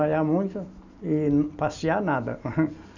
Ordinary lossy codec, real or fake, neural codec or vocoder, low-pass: none; real; none; 7.2 kHz